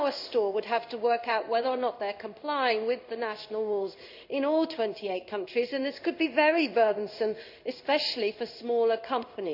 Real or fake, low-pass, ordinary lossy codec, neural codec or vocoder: fake; 5.4 kHz; none; codec, 16 kHz in and 24 kHz out, 1 kbps, XY-Tokenizer